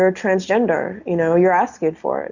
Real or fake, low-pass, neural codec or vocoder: real; 7.2 kHz; none